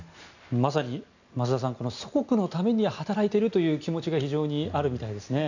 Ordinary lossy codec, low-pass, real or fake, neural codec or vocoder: none; 7.2 kHz; real; none